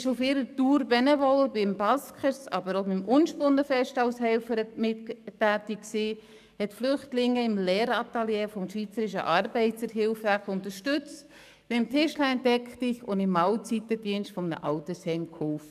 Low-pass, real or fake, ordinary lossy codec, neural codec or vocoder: 14.4 kHz; fake; none; codec, 44.1 kHz, 7.8 kbps, DAC